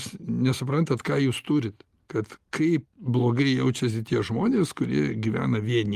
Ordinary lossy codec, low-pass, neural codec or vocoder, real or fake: Opus, 24 kbps; 14.4 kHz; none; real